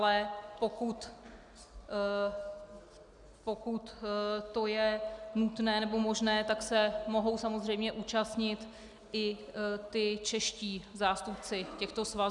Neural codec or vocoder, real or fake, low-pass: none; real; 10.8 kHz